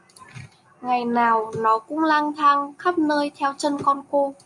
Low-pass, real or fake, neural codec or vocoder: 10.8 kHz; real; none